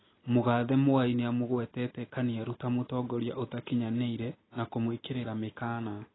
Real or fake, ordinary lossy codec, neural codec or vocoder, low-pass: real; AAC, 16 kbps; none; 7.2 kHz